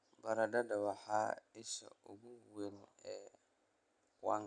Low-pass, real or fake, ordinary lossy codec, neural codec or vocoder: 9.9 kHz; real; none; none